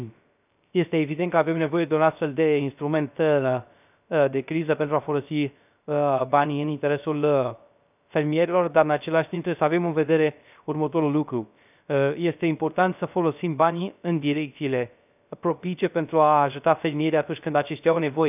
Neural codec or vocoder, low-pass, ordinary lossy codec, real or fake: codec, 16 kHz, 0.3 kbps, FocalCodec; 3.6 kHz; none; fake